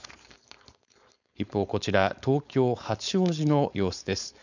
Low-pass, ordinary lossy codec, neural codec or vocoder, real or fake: 7.2 kHz; none; codec, 16 kHz, 4.8 kbps, FACodec; fake